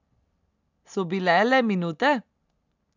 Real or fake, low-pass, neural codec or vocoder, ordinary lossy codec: real; 7.2 kHz; none; none